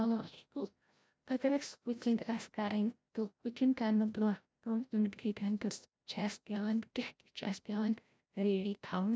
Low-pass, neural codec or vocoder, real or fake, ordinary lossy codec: none; codec, 16 kHz, 0.5 kbps, FreqCodec, larger model; fake; none